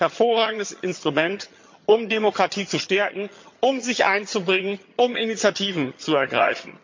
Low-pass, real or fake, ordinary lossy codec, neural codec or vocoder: 7.2 kHz; fake; MP3, 48 kbps; vocoder, 22.05 kHz, 80 mel bands, HiFi-GAN